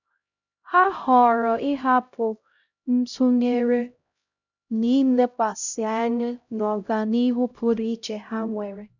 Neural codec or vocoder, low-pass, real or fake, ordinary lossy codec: codec, 16 kHz, 0.5 kbps, X-Codec, HuBERT features, trained on LibriSpeech; 7.2 kHz; fake; none